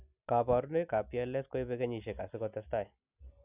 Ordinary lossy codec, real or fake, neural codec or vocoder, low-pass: none; real; none; 3.6 kHz